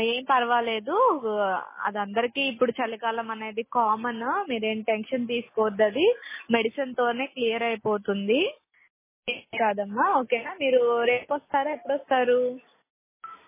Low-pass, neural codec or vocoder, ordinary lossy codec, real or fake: 3.6 kHz; none; MP3, 16 kbps; real